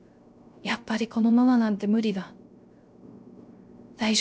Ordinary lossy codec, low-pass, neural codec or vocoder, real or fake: none; none; codec, 16 kHz, 0.3 kbps, FocalCodec; fake